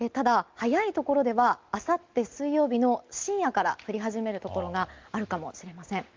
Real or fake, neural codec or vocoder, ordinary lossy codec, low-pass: real; none; Opus, 32 kbps; 7.2 kHz